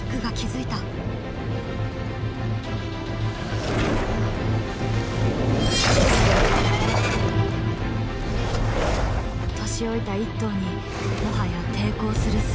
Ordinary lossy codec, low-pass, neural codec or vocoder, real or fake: none; none; none; real